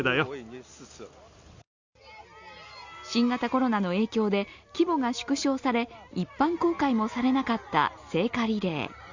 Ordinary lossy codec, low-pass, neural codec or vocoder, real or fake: Opus, 64 kbps; 7.2 kHz; none; real